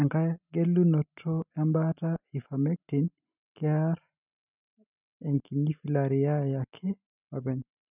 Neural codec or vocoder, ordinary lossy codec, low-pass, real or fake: none; none; 3.6 kHz; real